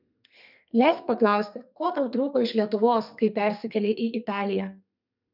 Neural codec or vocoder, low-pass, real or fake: codec, 32 kHz, 1.9 kbps, SNAC; 5.4 kHz; fake